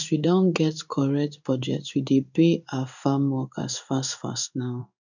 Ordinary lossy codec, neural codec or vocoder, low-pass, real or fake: none; codec, 16 kHz in and 24 kHz out, 1 kbps, XY-Tokenizer; 7.2 kHz; fake